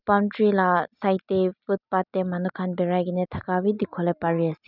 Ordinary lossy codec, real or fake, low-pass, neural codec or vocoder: none; real; 5.4 kHz; none